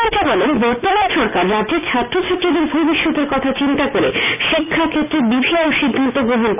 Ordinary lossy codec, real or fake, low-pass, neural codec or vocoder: none; real; 3.6 kHz; none